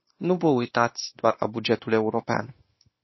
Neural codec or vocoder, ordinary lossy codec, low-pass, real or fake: codec, 16 kHz, 0.9 kbps, LongCat-Audio-Codec; MP3, 24 kbps; 7.2 kHz; fake